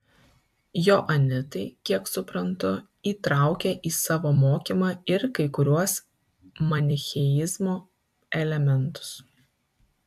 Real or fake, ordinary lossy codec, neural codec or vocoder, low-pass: fake; AAC, 96 kbps; vocoder, 44.1 kHz, 128 mel bands every 256 samples, BigVGAN v2; 14.4 kHz